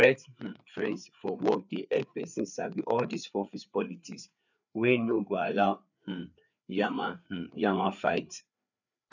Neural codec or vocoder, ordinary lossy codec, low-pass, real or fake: codec, 16 kHz, 4 kbps, FreqCodec, larger model; none; 7.2 kHz; fake